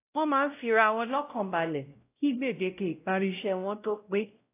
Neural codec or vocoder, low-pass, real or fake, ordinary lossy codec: codec, 16 kHz, 0.5 kbps, X-Codec, WavLM features, trained on Multilingual LibriSpeech; 3.6 kHz; fake; AAC, 24 kbps